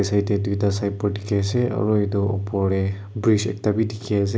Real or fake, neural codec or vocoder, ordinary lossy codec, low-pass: real; none; none; none